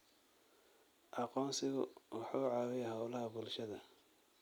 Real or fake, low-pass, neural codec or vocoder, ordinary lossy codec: real; none; none; none